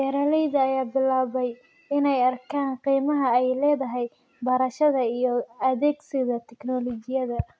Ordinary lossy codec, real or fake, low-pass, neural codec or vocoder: none; real; none; none